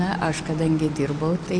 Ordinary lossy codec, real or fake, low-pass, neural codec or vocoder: MP3, 64 kbps; real; 9.9 kHz; none